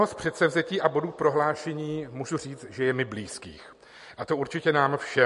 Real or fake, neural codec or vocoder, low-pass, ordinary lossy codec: real; none; 14.4 kHz; MP3, 48 kbps